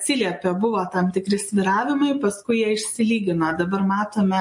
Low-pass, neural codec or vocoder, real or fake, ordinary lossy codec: 10.8 kHz; none; real; MP3, 48 kbps